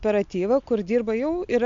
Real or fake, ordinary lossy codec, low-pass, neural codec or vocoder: real; AAC, 64 kbps; 7.2 kHz; none